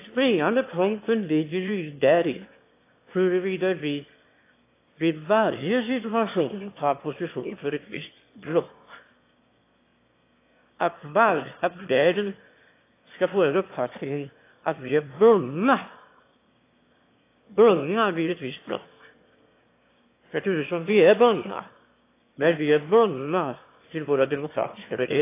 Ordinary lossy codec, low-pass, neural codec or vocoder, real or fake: AAC, 24 kbps; 3.6 kHz; autoencoder, 22.05 kHz, a latent of 192 numbers a frame, VITS, trained on one speaker; fake